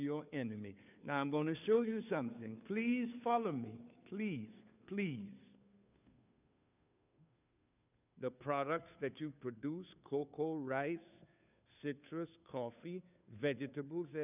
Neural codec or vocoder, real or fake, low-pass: codec, 16 kHz, 2 kbps, FunCodec, trained on Chinese and English, 25 frames a second; fake; 3.6 kHz